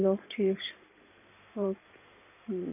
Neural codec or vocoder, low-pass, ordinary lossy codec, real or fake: codec, 16 kHz in and 24 kHz out, 2.2 kbps, FireRedTTS-2 codec; 3.6 kHz; none; fake